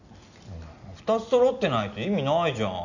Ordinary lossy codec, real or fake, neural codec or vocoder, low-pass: none; real; none; 7.2 kHz